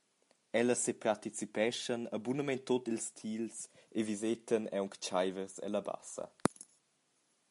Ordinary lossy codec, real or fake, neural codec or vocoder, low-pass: MP3, 64 kbps; real; none; 10.8 kHz